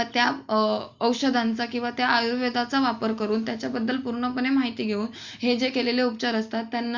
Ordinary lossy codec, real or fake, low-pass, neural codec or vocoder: Opus, 64 kbps; real; 7.2 kHz; none